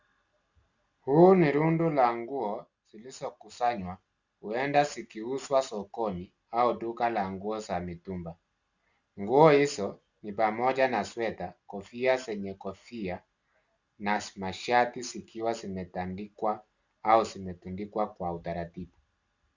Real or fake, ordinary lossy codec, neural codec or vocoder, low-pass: real; Opus, 64 kbps; none; 7.2 kHz